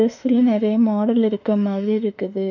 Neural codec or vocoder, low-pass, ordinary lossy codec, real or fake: autoencoder, 48 kHz, 32 numbers a frame, DAC-VAE, trained on Japanese speech; 7.2 kHz; none; fake